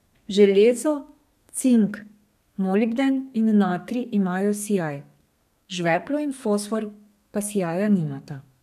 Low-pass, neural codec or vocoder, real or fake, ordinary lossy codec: 14.4 kHz; codec, 32 kHz, 1.9 kbps, SNAC; fake; none